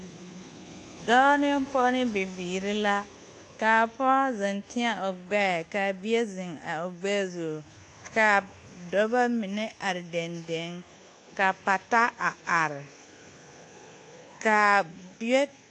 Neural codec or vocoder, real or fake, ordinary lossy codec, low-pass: codec, 24 kHz, 1.2 kbps, DualCodec; fake; AAC, 48 kbps; 10.8 kHz